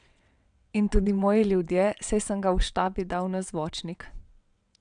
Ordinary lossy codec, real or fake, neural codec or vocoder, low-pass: none; fake; vocoder, 22.05 kHz, 80 mel bands, WaveNeXt; 9.9 kHz